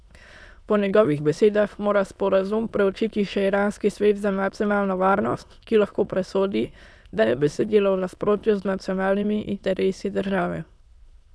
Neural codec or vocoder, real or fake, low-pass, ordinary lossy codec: autoencoder, 22.05 kHz, a latent of 192 numbers a frame, VITS, trained on many speakers; fake; none; none